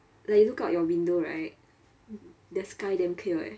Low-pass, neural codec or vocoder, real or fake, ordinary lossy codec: none; none; real; none